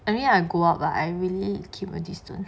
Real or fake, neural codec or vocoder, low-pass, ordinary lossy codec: real; none; none; none